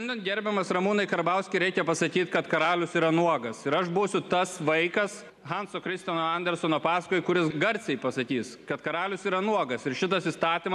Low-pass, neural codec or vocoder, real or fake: 14.4 kHz; none; real